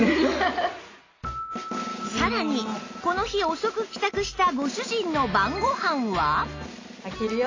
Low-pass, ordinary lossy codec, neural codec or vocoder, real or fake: 7.2 kHz; AAC, 32 kbps; none; real